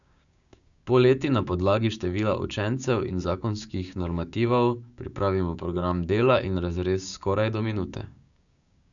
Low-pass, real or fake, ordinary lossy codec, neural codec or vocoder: 7.2 kHz; fake; none; codec, 16 kHz, 6 kbps, DAC